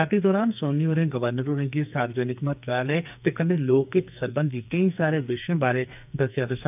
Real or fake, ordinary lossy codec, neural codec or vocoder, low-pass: fake; none; codec, 44.1 kHz, 2.6 kbps, SNAC; 3.6 kHz